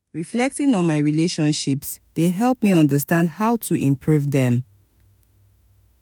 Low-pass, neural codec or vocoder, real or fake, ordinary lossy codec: none; autoencoder, 48 kHz, 32 numbers a frame, DAC-VAE, trained on Japanese speech; fake; none